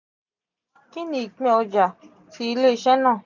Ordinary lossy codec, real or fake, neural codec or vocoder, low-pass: none; real; none; 7.2 kHz